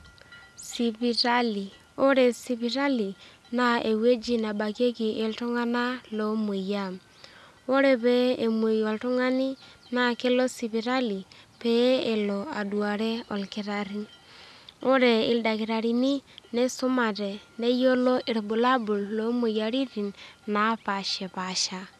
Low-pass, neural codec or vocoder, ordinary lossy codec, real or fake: none; none; none; real